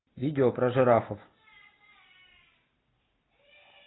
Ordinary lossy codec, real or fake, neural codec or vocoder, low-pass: AAC, 16 kbps; real; none; 7.2 kHz